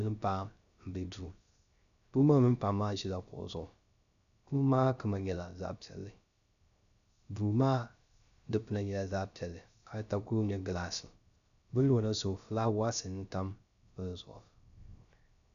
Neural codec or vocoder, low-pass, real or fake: codec, 16 kHz, 0.3 kbps, FocalCodec; 7.2 kHz; fake